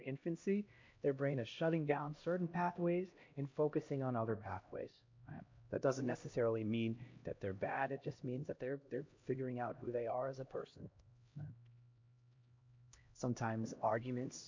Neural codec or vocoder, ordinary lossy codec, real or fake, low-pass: codec, 16 kHz, 1 kbps, X-Codec, HuBERT features, trained on LibriSpeech; MP3, 48 kbps; fake; 7.2 kHz